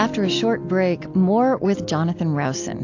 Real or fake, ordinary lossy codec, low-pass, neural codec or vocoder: real; AAC, 48 kbps; 7.2 kHz; none